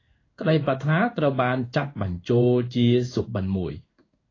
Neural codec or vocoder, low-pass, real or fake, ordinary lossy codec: codec, 16 kHz in and 24 kHz out, 1 kbps, XY-Tokenizer; 7.2 kHz; fake; AAC, 32 kbps